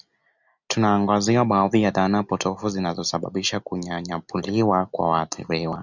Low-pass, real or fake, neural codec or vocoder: 7.2 kHz; real; none